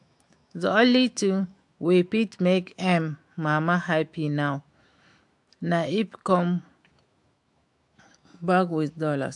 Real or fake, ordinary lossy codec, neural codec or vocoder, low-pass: fake; AAC, 64 kbps; autoencoder, 48 kHz, 128 numbers a frame, DAC-VAE, trained on Japanese speech; 10.8 kHz